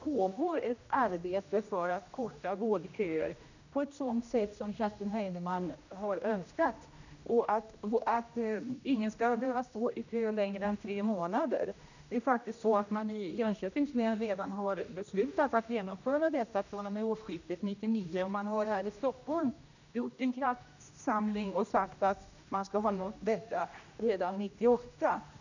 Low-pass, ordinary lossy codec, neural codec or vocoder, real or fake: 7.2 kHz; none; codec, 16 kHz, 1 kbps, X-Codec, HuBERT features, trained on general audio; fake